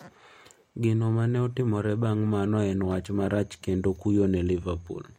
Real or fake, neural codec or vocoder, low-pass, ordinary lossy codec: real; none; 19.8 kHz; MP3, 64 kbps